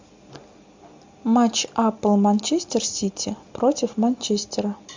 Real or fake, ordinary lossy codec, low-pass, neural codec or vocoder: real; MP3, 64 kbps; 7.2 kHz; none